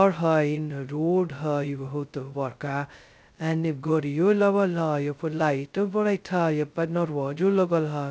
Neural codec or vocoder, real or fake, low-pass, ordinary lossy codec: codec, 16 kHz, 0.2 kbps, FocalCodec; fake; none; none